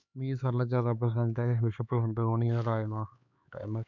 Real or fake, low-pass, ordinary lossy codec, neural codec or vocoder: fake; 7.2 kHz; none; codec, 16 kHz, 4 kbps, X-Codec, HuBERT features, trained on LibriSpeech